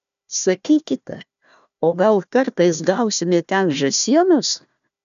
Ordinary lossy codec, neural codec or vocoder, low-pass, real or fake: AAC, 96 kbps; codec, 16 kHz, 1 kbps, FunCodec, trained on Chinese and English, 50 frames a second; 7.2 kHz; fake